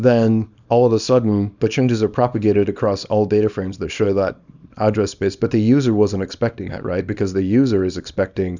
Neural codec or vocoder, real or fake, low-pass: codec, 24 kHz, 0.9 kbps, WavTokenizer, small release; fake; 7.2 kHz